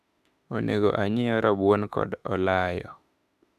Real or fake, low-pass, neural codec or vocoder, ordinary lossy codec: fake; 14.4 kHz; autoencoder, 48 kHz, 32 numbers a frame, DAC-VAE, trained on Japanese speech; none